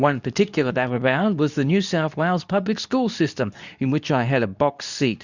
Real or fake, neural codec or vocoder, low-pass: fake; codec, 24 kHz, 0.9 kbps, WavTokenizer, medium speech release version 2; 7.2 kHz